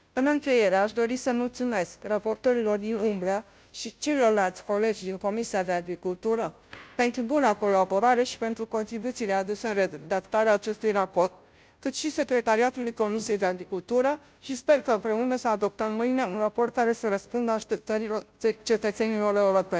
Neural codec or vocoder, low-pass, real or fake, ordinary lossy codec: codec, 16 kHz, 0.5 kbps, FunCodec, trained on Chinese and English, 25 frames a second; none; fake; none